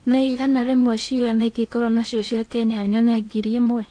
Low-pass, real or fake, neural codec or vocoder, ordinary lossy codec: 9.9 kHz; fake; codec, 16 kHz in and 24 kHz out, 0.8 kbps, FocalCodec, streaming, 65536 codes; AAC, 48 kbps